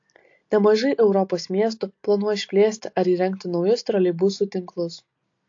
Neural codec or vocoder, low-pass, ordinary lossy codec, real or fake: none; 7.2 kHz; AAC, 48 kbps; real